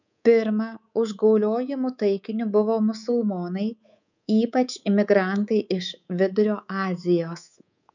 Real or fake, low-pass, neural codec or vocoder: fake; 7.2 kHz; codec, 24 kHz, 3.1 kbps, DualCodec